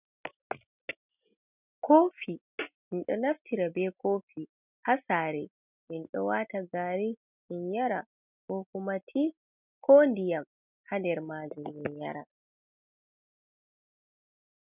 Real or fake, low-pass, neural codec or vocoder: real; 3.6 kHz; none